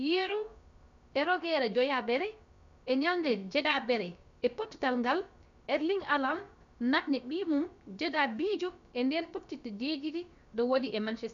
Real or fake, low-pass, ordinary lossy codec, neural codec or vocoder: fake; 7.2 kHz; none; codec, 16 kHz, about 1 kbps, DyCAST, with the encoder's durations